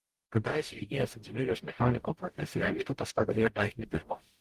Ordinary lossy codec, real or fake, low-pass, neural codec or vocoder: Opus, 24 kbps; fake; 14.4 kHz; codec, 44.1 kHz, 0.9 kbps, DAC